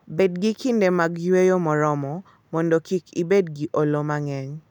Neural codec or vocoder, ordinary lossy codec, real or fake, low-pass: none; none; real; 19.8 kHz